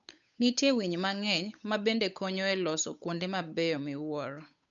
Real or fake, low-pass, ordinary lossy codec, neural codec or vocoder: fake; 7.2 kHz; none; codec, 16 kHz, 8 kbps, FunCodec, trained on Chinese and English, 25 frames a second